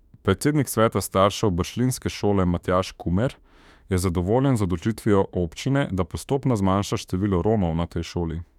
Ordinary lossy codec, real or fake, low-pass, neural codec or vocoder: none; fake; 19.8 kHz; autoencoder, 48 kHz, 32 numbers a frame, DAC-VAE, trained on Japanese speech